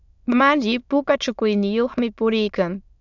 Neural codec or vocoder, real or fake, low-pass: autoencoder, 22.05 kHz, a latent of 192 numbers a frame, VITS, trained on many speakers; fake; 7.2 kHz